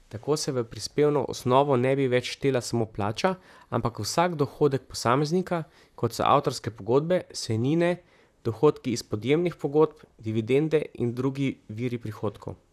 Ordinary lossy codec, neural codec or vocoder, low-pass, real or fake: none; vocoder, 44.1 kHz, 128 mel bands, Pupu-Vocoder; 14.4 kHz; fake